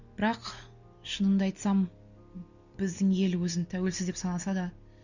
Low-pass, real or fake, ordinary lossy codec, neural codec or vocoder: 7.2 kHz; real; none; none